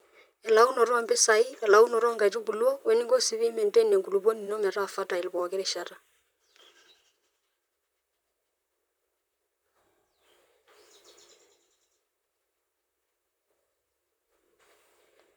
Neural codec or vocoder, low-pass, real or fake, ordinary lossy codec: vocoder, 44.1 kHz, 128 mel bands, Pupu-Vocoder; none; fake; none